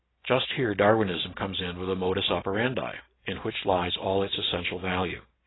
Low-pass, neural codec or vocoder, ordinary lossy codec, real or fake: 7.2 kHz; none; AAC, 16 kbps; real